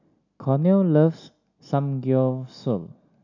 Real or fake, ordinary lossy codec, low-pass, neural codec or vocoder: real; none; 7.2 kHz; none